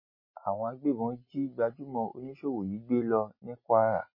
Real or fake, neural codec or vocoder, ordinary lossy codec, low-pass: real; none; MP3, 24 kbps; 5.4 kHz